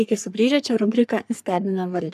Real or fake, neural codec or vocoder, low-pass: fake; codec, 44.1 kHz, 3.4 kbps, Pupu-Codec; 14.4 kHz